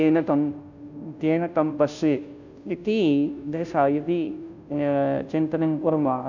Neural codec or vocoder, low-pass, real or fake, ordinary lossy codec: codec, 16 kHz, 0.5 kbps, FunCodec, trained on Chinese and English, 25 frames a second; 7.2 kHz; fake; none